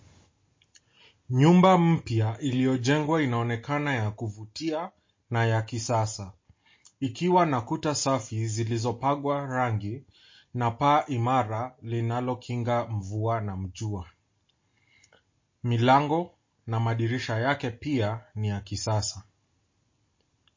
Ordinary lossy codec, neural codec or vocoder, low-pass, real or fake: MP3, 32 kbps; none; 7.2 kHz; real